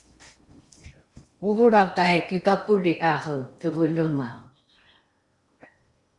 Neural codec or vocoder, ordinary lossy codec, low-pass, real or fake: codec, 16 kHz in and 24 kHz out, 0.8 kbps, FocalCodec, streaming, 65536 codes; Opus, 64 kbps; 10.8 kHz; fake